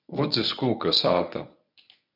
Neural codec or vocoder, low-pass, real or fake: codec, 24 kHz, 0.9 kbps, WavTokenizer, medium speech release version 1; 5.4 kHz; fake